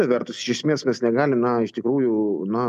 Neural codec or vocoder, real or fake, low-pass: none; real; 14.4 kHz